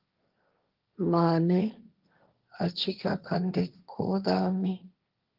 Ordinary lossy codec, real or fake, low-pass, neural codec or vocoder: Opus, 32 kbps; fake; 5.4 kHz; codec, 16 kHz, 1.1 kbps, Voila-Tokenizer